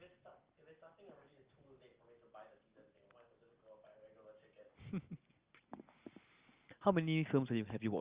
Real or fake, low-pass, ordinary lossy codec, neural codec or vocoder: real; 3.6 kHz; Opus, 24 kbps; none